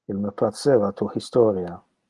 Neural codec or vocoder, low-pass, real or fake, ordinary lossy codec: none; 10.8 kHz; real; Opus, 24 kbps